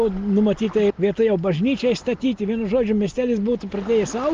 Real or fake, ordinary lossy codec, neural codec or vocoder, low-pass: real; Opus, 24 kbps; none; 7.2 kHz